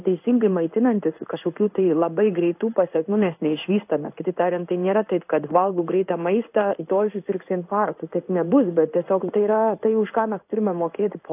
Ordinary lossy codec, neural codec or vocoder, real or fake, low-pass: AAC, 32 kbps; codec, 16 kHz in and 24 kHz out, 1 kbps, XY-Tokenizer; fake; 3.6 kHz